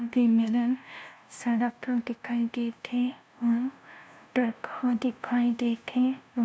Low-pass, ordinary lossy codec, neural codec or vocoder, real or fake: none; none; codec, 16 kHz, 0.5 kbps, FunCodec, trained on LibriTTS, 25 frames a second; fake